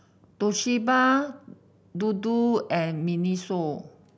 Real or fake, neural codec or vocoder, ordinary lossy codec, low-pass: real; none; none; none